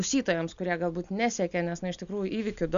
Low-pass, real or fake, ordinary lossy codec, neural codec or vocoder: 7.2 kHz; real; MP3, 96 kbps; none